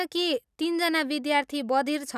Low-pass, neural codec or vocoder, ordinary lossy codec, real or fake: 14.4 kHz; none; none; real